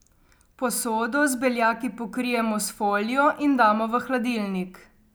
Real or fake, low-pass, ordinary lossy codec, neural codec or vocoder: real; none; none; none